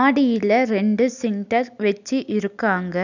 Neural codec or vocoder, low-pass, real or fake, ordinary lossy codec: none; 7.2 kHz; real; none